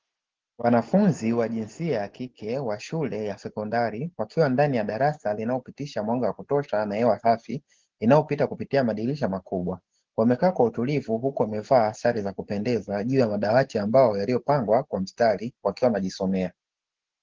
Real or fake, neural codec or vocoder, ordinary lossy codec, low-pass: real; none; Opus, 16 kbps; 7.2 kHz